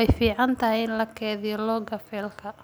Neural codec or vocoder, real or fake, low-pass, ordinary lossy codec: none; real; none; none